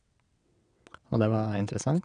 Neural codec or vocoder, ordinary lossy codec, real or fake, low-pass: vocoder, 22.05 kHz, 80 mel bands, WaveNeXt; none; fake; 9.9 kHz